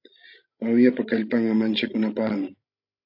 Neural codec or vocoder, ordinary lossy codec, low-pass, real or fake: codec, 16 kHz, 16 kbps, FreqCodec, larger model; AAC, 48 kbps; 5.4 kHz; fake